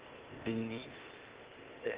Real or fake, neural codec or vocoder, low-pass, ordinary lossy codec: fake; codec, 16 kHz, 0.8 kbps, ZipCodec; 3.6 kHz; Opus, 16 kbps